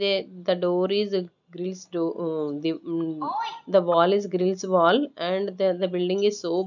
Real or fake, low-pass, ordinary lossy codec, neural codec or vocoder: real; 7.2 kHz; none; none